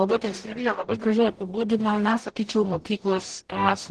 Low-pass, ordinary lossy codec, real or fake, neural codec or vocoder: 10.8 kHz; Opus, 16 kbps; fake; codec, 44.1 kHz, 0.9 kbps, DAC